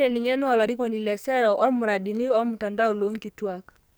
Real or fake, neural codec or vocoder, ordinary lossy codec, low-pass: fake; codec, 44.1 kHz, 2.6 kbps, SNAC; none; none